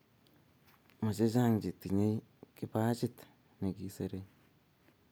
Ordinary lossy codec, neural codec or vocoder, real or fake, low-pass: none; none; real; none